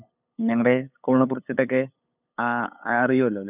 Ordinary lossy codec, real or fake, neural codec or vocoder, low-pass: none; fake; codec, 16 kHz, 8 kbps, FunCodec, trained on LibriTTS, 25 frames a second; 3.6 kHz